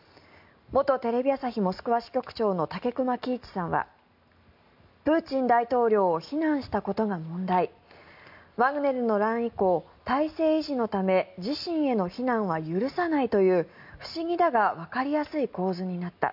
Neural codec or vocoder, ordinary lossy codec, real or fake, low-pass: none; MP3, 48 kbps; real; 5.4 kHz